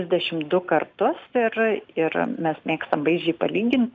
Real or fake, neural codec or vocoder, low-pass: real; none; 7.2 kHz